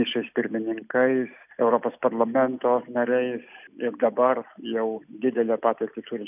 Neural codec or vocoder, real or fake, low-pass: none; real; 3.6 kHz